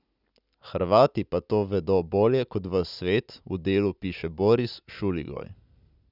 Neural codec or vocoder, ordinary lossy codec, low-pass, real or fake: none; none; 5.4 kHz; real